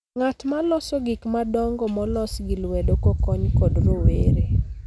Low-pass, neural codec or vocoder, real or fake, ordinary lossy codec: none; none; real; none